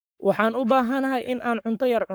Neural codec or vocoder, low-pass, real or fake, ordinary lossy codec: codec, 44.1 kHz, 7.8 kbps, Pupu-Codec; none; fake; none